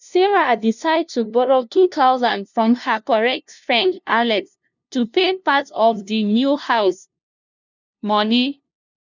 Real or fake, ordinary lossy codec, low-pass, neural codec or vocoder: fake; none; 7.2 kHz; codec, 16 kHz, 0.5 kbps, FunCodec, trained on LibriTTS, 25 frames a second